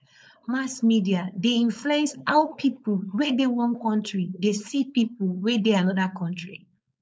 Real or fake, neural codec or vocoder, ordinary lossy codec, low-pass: fake; codec, 16 kHz, 4.8 kbps, FACodec; none; none